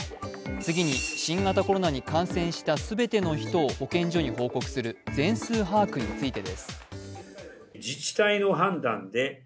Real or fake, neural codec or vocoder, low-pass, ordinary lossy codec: real; none; none; none